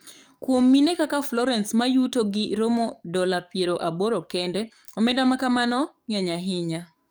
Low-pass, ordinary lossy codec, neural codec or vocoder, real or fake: none; none; codec, 44.1 kHz, 7.8 kbps, DAC; fake